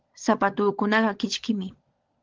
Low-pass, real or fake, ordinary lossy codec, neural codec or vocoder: 7.2 kHz; fake; Opus, 16 kbps; codec, 16 kHz, 16 kbps, FunCodec, trained on LibriTTS, 50 frames a second